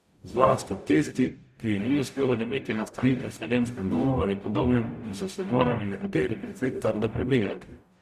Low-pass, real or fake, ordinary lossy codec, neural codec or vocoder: 14.4 kHz; fake; Opus, 64 kbps; codec, 44.1 kHz, 0.9 kbps, DAC